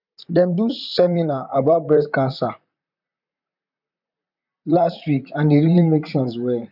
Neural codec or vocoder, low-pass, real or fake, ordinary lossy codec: vocoder, 44.1 kHz, 128 mel bands, Pupu-Vocoder; 5.4 kHz; fake; none